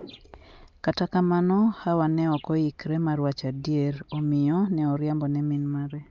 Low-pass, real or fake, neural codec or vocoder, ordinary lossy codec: 7.2 kHz; real; none; Opus, 24 kbps